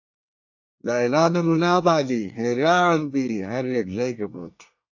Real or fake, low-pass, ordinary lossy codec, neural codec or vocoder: fake; 7.2 kHz; AAC, 48 kbps; codec, 24 kHz, 1 kbps, SNAC